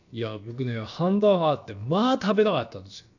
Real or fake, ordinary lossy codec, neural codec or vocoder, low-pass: fake; none; codec, 16 kHz, about 1 kbps, DyCAST, with the encoder's durations; 7.2 kHz